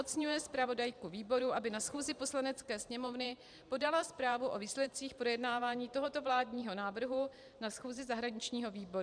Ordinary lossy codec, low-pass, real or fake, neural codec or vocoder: MP3, 96 kbps; 9.9 kHz; fake; vocoder, 44.1 kHz, 128 mel bands every 512 samples, BigVGAN v2